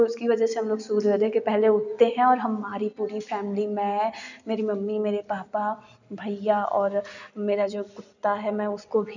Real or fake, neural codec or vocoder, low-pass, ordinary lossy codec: real; none; 7.2 kHz; none